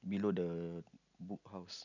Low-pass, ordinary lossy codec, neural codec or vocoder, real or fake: 7.2 kHz; none; none; real